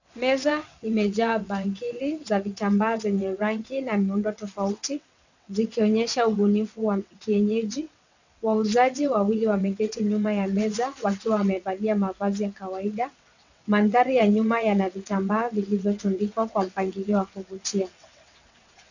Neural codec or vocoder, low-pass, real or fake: vocoder, 22.05 kHz, 80 mel bands, WaveNeXt; 7.2 kHz; fake